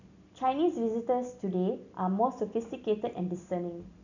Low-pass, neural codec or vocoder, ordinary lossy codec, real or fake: 7.2 kHz; none; none; real